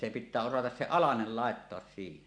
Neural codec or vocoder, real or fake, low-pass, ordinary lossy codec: none; real; 9.9 kHz; AAC, 64 kbps